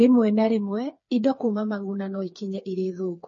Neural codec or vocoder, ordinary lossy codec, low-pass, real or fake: codec, 16 kHz, 4 kbps, FreqCodec, smaller model; MP3, 32 kbps; 7.2 kHz; fake